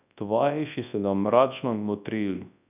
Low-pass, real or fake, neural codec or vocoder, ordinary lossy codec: 3.6 kHz; fake; codec, 24 kHz, 0.9 kbps, WavTokenizer, large speech release; none